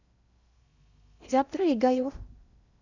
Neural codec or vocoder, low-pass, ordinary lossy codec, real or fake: codec, 16 kHz in and 24 kHz out, 0.6 kbps, FocalCodec, streaming, 2048 codes; 7.2 kHz; none; fake